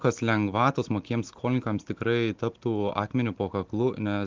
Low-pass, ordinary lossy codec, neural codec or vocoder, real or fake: 7.2 kHz; Opus, 32 kbps; none; real